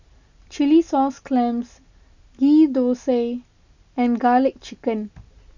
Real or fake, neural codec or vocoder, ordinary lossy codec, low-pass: real; none; none; 7.2 kHz